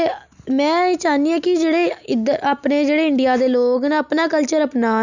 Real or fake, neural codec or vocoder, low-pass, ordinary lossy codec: real; none; 7.2 kHz; none